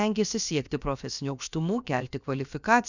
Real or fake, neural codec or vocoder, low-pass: fake; codec, 16 kHz, about 1 kbps, DyCAST, with the encoder's durations; 7.2 kHz